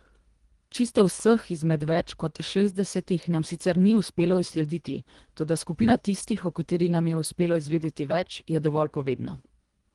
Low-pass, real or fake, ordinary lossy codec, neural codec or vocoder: 10.8 kHz; fake; Opus, 24 kbps; codec, 24 kHz, 1.5 kbps, HILCodec